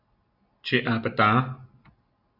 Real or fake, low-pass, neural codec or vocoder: real; 5.4 kHz; none